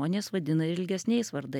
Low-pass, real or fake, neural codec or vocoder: 19.8 kHz; real; none